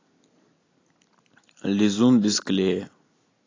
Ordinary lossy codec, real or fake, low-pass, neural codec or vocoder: AAC, 32 kbps; real; 7.2 kHz; none